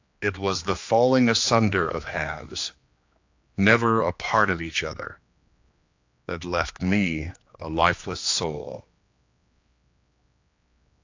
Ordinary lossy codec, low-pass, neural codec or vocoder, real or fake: AAC, 48 kbps; 7.2 kHz; codec, 16 kHz, 2 kbps, X-Codec, HuBERT features, trained on general audio; fake